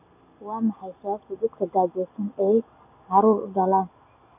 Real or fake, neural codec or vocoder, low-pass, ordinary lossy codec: real; none; 3.6 kHz; none